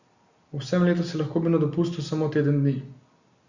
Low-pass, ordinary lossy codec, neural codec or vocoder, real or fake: 7.2 kHz; Opus, 64 kbps; none; real